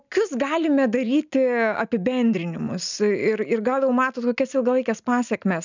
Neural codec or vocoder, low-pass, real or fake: none; 7.2 kHz; real